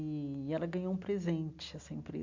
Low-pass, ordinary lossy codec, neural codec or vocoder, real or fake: 7.2 kHz; MP3, 64 kbps; none; real